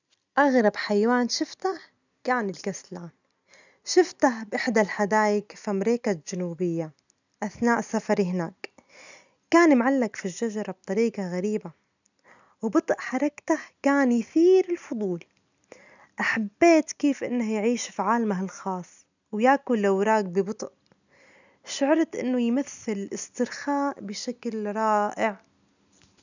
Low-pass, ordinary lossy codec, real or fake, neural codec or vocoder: 7.2 kHz; none; real; none